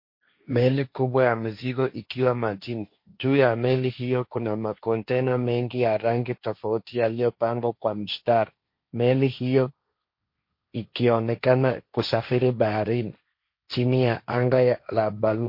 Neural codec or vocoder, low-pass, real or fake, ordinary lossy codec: codec, 16 kHz, 1.1 kbps, Voila-Tokenizer; 5.4 kHz; fake; MP3, 32 kbps